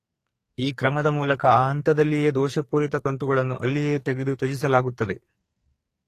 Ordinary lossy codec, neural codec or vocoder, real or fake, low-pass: AAC, 48 kbps; codec, 44.1 kHz, 2.6 kbps, SNAC; fake; 14.4 kHz